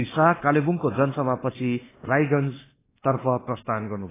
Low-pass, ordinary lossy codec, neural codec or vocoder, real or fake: 3.6 kHz; AAC, 16 kbps; codec, 24 kHz, 1.2 kbps, DualCodec; fake